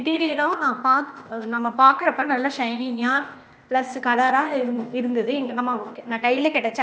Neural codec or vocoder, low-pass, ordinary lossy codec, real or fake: codec, 16 kHz, 0.8 kbps, ZipCodec; none; none; fake